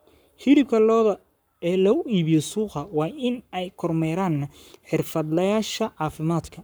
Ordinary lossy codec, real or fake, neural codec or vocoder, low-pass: none; fake; codec, 44.1 kHz, 7.8 kbps, Pupu-Codec; none